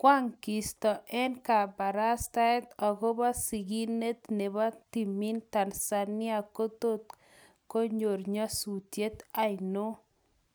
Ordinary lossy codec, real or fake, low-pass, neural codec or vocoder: none; real; none; none